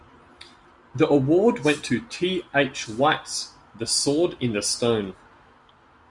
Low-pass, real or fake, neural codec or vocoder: 10.8 kHz; real; none